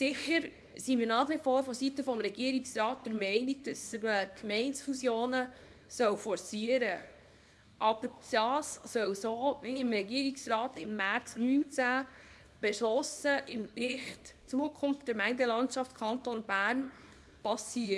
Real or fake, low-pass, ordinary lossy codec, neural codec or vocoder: fake; none; none; codec, 24 kHz, 0.9 kbps, WavTokenizer, small release